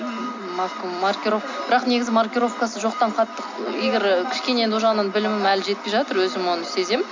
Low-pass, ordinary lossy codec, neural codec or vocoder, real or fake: 7.2 kHz; MP3, 48 kbps; none; real